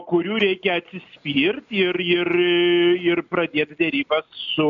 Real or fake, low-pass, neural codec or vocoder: real; 7.2 kHz; none